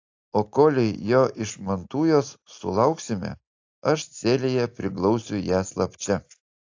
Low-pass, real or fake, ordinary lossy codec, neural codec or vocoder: 7.2 kHz; real; AAC, 48 kbps; none